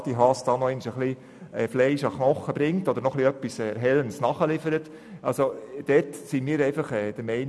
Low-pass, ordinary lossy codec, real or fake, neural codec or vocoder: none; none; real; none